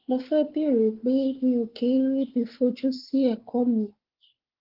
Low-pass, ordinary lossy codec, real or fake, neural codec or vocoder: 5.4 kHz; Opus, 16 kbps; fake; codec, 16 kHz, 4 kbps, X-Codec, WavLM features, trained on Multilingual LibriSpeech